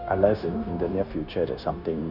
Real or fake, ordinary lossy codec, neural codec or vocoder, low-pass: fake; none; codec, 16 kHz, 0.9 kbps, LongCat-Audio-Codec; 5.4 kHz